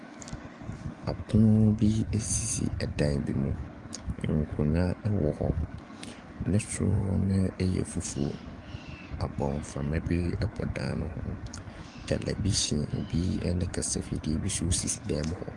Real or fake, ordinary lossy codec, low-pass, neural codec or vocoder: fake; Opus, 32 kbps; 10.8 kHz; codec, 44.1 kHz, 7.8 kbps, DAC